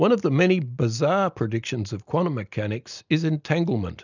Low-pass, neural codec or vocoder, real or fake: 7.2 kHz; none; real